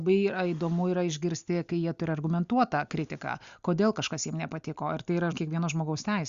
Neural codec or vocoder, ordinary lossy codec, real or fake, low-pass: none; Opus, 64 kbps; real; 7.2 kHz